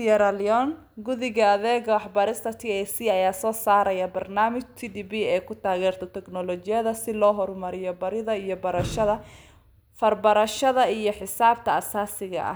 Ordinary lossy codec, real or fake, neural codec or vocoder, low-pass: none; real; none; none